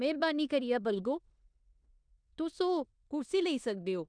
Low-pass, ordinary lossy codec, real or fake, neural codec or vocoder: 9.9 kHz; none; fake; codec, 16 kHz in and 24 kHz out, 0.9 kbps, LongCat-Audio-Codec, four codebook decoder